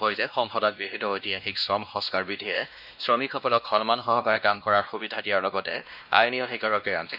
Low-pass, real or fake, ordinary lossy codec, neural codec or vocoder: 5.4 kHz; fake; none; codec, 16 kHz, 1 kbps, X-Codec, WavLM features, trained on Multilingual LibriSpeech